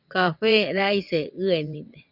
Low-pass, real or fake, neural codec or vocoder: 5.4 kHz; fake; vocoder, 22.05 kHz, 80 mel bands, WaveNeXt